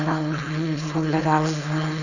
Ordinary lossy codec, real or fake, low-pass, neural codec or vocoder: none; fake; 7.2 kHz; codec, 16 kHz, 4.8 kbps, FACodec